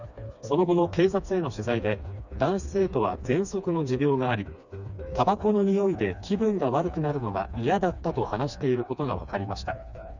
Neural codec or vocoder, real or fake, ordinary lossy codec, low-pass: codec, 16 kHz, 2 kbps, FreqCodec, smaller model; fake; none; 7.2 kHz